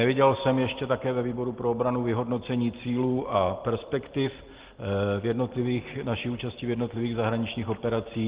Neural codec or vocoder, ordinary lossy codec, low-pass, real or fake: none; Opus, 16 kbps; 3.6 kHz; real